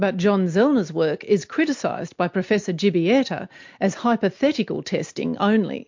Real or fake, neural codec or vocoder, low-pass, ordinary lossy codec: real; none; 7.2 kHz; MP3, 48 kbps